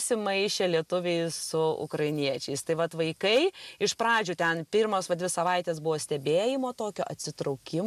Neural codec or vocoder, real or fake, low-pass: none; real; 14.4 kHz